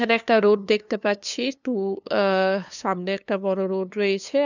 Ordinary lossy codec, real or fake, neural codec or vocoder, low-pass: none; fake; codec, 16 kHz, 2 kbps, FunCodec, trained on LibriTTS, 25 frames a second; 7.2 kHz